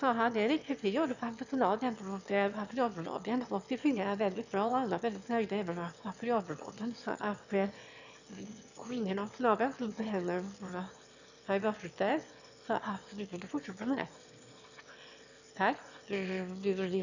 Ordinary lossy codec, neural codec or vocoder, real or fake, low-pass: none; autoencoder, 22.05 kHz, a latent of 192 numbers a frame, VITS, trained on one speaker; fake; 7.2 kHz